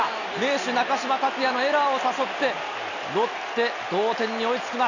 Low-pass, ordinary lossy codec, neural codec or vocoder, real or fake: 7.2 kHz; none; none; real